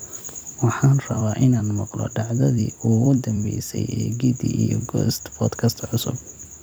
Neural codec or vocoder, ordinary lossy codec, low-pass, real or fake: vocoder, 44.1 kHz, 128 mel bands every 256 samples, BigVGAN v2; none; none; fake